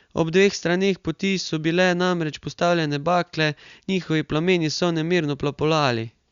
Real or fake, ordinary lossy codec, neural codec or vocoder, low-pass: real; Opus, 64 kbps; none; 7.2 kHz